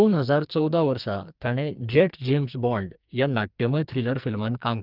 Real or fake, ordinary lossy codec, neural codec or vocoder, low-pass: fake; Opus, 24 kbps; codec, 44.1 kHz, 2.6 kbps, SNAC; 5.4 kHz